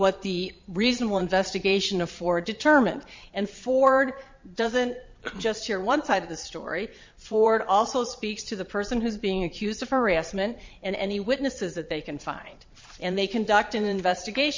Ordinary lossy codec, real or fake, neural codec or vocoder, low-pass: MP3, 64 kbps; fake; vocoder, 22.05 kHz, 80 mel bands, Vocos; 7.2 kHz